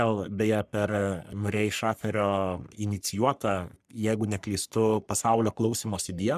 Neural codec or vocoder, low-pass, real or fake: codec, 44.1 kHz, 3.4 kbps, Pupu-Codec; 14.4 kHz; fake